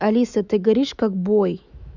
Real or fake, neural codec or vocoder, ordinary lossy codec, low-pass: fake; autoencoder, 48 kHz, 128 numbers a frame, DAC-VAE, trained on Japanese speech; none; 7.2 kHz